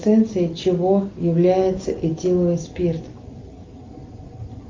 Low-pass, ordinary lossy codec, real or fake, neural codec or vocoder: 7.2 kHz; Opus, 32 kbps; real; none